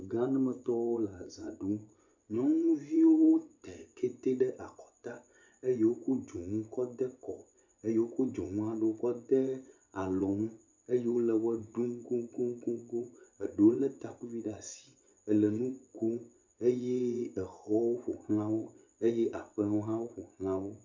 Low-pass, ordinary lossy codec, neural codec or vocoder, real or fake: 7.2 kHz; MP3, 64 kbps; vocoder, 24 kHz, 100 mel bands, Vocos; fake